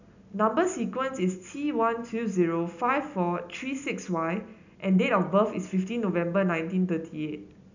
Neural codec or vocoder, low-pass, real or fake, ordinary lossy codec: none; 7.2 kHz; real; none